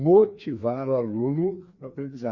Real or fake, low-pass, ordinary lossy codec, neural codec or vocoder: fake; 7.2 kHz; Opus, 64 kbps; codec, 16 kHz, 2 kbps, FreqCodec, larger model